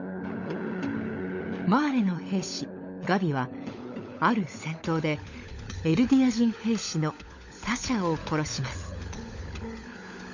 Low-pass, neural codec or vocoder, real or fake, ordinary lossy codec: 7.2 kHz; codec, 16 kHz, 16 kbps, FunCodec, trained on LibriTTS, 50 frames a second; fake; none